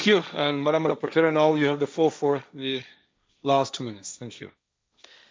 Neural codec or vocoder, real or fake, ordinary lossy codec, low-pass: codec, 16 kHz, 1.1 kbps, Voila-Tokenizer; fake; none; none